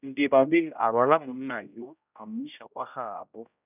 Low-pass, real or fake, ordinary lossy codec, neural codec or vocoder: 3.6 kHz; fake; none; codec, 16 kHz, 0.5 kbps, X-Codec, HuBERT features, trained on general audio